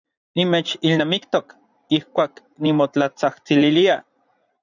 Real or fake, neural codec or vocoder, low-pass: fake; vocoder, 44.1 kHz, 80 mel bands, Vocos; 7.2 kHz